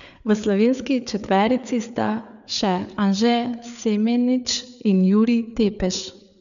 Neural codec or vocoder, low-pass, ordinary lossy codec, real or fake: codec, 16 kHz, 4 kbps, FreqCodec, larger model; 7.2 kHz; none; fake